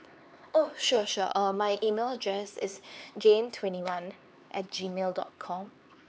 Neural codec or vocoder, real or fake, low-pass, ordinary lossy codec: codec, 16 kHz, 4 kbps, X-Codec, HuBERT features, trained on LibriSpeech; fake; none; none